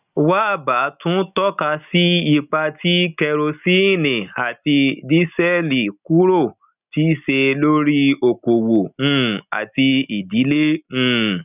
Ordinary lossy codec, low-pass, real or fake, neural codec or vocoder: none; 3.6 kHz; real; none